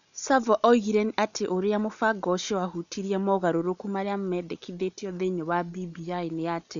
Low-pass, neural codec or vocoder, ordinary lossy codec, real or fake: 7.2 kHz; none; none; real